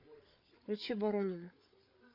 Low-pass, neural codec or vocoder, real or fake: 5.4 kHz; codec, 16 kHz, 8 kbps, FreqCodec, larger model; fake